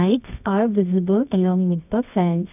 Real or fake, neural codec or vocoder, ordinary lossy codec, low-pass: fake; codec, 24 kHz, 0.9 kbps, WavTokenizer, medium music audio release; none; 3.6 kHz